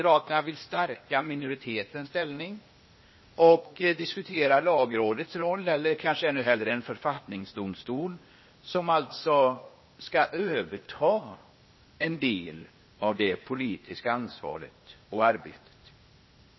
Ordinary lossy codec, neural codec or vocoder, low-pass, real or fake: MP3, 24 kbps; codec, 16 kHz, 0.8 kbps, ZipCodec; 7.2 kHz; fake